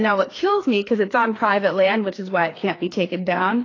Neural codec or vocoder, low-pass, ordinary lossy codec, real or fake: codec, 16 kHz, 2 kbps, FreqCodec, larger model; 7.2 kHz; AAC, 32 kbps; fake